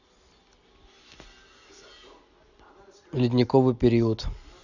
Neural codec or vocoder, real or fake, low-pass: none; real; 7.2 kHz